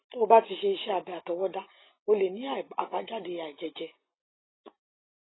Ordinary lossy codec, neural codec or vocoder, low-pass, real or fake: AAC, 16 kbps; none; 7.2 kHz; real